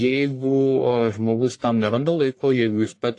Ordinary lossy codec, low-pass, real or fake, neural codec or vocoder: AAC, 48 kbps; 10.8 kHz; fake; codec, 44.1 kHz, 1.7 kbps, Pupu-Codec